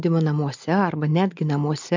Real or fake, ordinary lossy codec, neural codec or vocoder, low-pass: real; MP3, 64 kbps; none; 7.2 kHz